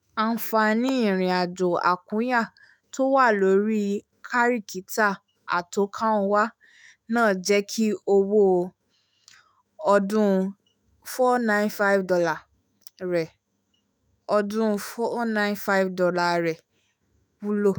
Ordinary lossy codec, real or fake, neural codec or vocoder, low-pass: none; fake; autoencoder, 48 kHz, 128 numbers a frame, DAC-VAE, trained on Japanese speech; none